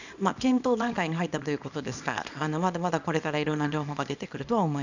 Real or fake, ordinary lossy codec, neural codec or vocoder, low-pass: fake; none; codec, 24 kHz, 0.9 kbps, WavTokenizer, small release; 7.2 kHz